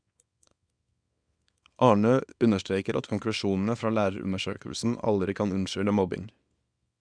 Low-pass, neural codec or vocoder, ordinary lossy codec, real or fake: 9.9 kHz; codec, 24 kHz, 0.9 kbps, WavTokenizer, small release; none; fake